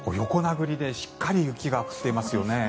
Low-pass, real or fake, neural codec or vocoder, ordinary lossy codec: none; real; none; none